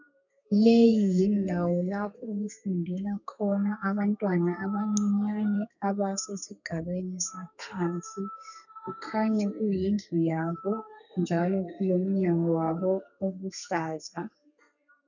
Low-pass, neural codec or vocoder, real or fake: 7.2 kHz; codec, 32 kHz, 1.9 kbps, SNAC; fake